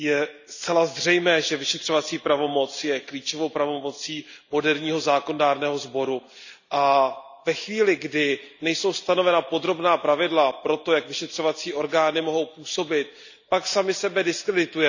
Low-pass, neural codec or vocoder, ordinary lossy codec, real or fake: 7.2 kHz; none; none; real